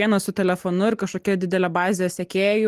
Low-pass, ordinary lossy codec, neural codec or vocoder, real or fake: 14.4 kHz; Opus, 24 kbps; none; real